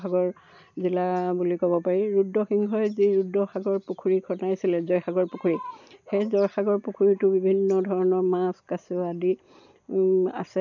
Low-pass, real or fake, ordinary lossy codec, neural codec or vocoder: 7.2 kHz; real; none; none